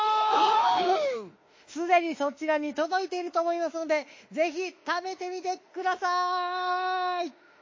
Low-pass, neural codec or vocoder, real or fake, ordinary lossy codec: 7.2 kHz; autoencoder, 48 kHz, 32 numbers a frame, DAC-VAE, trained on Japanese speech; fake; MP3, 32 kbps